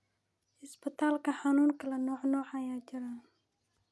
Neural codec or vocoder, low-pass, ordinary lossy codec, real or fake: none; none; none; real